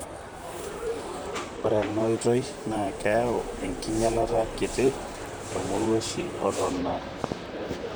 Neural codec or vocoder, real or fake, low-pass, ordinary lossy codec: vocoder, 44.1 kHz, 128 mel bands, Pupu-Vocoder; fake; none; none